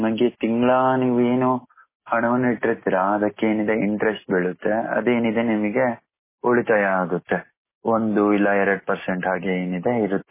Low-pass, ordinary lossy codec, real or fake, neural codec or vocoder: 3.6 kHz; MP3, 16 kbps; real; none